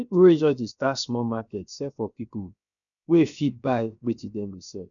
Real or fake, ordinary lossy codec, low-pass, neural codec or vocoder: fake; none; 7.2 kHz; codec, 16 kHz, 0.7 kbps, FocalCodec